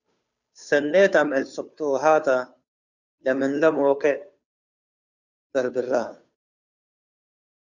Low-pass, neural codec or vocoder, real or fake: 7.2 kHz; codec, 16 kHz, 2 kbps, FunCodec, trained on Chinese and English, 25 frames a second; fake